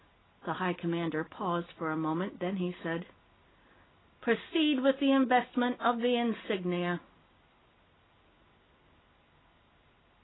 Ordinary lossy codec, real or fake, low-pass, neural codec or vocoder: AAC, 16 kbps; real; 7.2 kHz; none